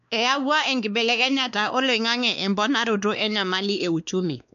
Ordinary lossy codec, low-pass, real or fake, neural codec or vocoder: none; 7.2 kHz; fake; codec, 16 kHz, 2 kbps, X-Codec, WavLM features, trained on Multilingual LibriSpeech